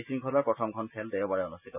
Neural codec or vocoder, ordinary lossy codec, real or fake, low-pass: none; none; real; 3.6 kHz